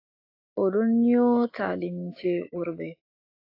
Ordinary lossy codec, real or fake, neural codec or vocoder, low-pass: AAC, 32 kbps; fake; autoencoder, 48 kHz, 128 numbers a frame, DAC-VAE, trained on Japanese speech; 5.4 kHz